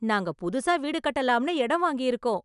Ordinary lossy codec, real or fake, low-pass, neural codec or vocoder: none; real; 10.8 kHz; none